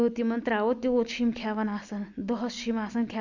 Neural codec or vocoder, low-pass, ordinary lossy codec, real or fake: none; 7.2 kHz; none; real